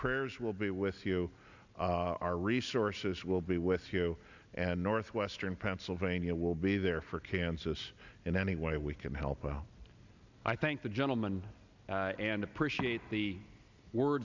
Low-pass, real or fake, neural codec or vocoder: 7.2 kHz; real; none